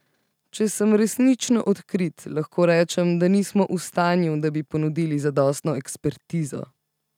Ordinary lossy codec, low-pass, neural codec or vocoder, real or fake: none; 19.8 kHz; none; real